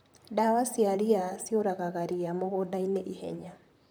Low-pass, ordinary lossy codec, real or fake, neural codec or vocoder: none; none; fake; vocoder, 44.1 kHz, 128 mel bands, Pupu-Vocoder